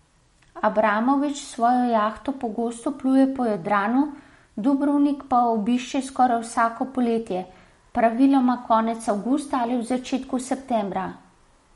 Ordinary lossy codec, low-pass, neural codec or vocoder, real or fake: MP3, 48 kbps; 19.8 kHz; none; real